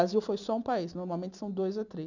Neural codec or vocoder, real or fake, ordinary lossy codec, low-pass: none; real; none; 7.2 kHz